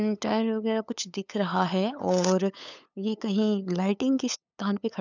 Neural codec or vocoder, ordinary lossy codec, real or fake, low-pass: codec, 16 kHz, 8 kbps, FunCodec, trained on LibriTTS, 25 frames a second; none; fake; 7.2 kHz